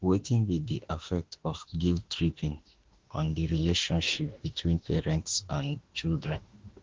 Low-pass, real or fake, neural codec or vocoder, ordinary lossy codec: 7.2 kHz; fake; autoencoder, 48 kHz, 32 numbers a frame, DAC-VAE, trained on Japanese speech; Opus, 16 kbps